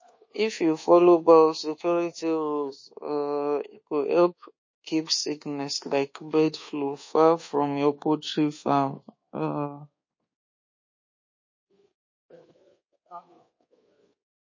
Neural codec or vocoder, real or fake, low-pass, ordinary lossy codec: codec, 24 kHz, 1.2 kbps, DualCodec; fake; 7.2 kHz; MP3, 32 kbps